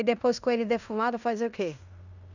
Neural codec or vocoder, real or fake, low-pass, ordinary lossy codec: codec, 16 kHz in and 24 kHz out, 0.9 kbps, LongCat-Audio-Codec, fine tuned four codebook decoder; fake; 7.2 kHz; none